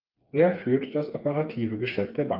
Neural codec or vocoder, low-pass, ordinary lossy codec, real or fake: codec, 16 kHz, 4 kbps, FreqCodec, smaller model; 5.4 kHz; Opus, 24 kbps; fake